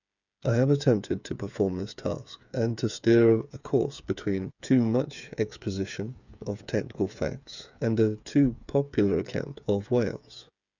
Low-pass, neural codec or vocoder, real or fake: 7.2 kHz; codec, 16 kHz, 8 kbps, FreqCodec, smaller model; fake